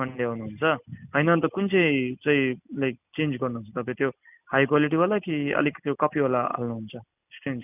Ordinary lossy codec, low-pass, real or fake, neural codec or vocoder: none; 3.6 kHz; real; none